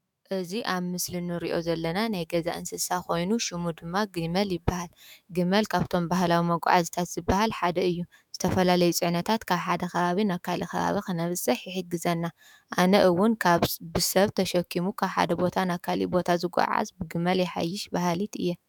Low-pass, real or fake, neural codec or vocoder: 19.8 kHz; fake; autoencoder, 48 kHz, 128 numbers a frame, DAC-VAE, trained on Japanese speech